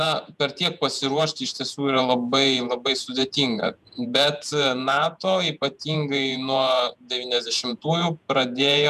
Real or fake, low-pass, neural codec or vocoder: fake; 14.4 kHz; vocoder, 48 kHz, 128 mel bands, Vocos